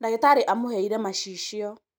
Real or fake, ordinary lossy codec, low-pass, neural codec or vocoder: real; none; none; none